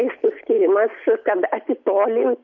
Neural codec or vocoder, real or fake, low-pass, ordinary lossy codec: none; real; 7.2 kHz; MP3, 48 kbps